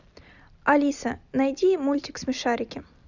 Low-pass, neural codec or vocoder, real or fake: 7.2 kHz; none; real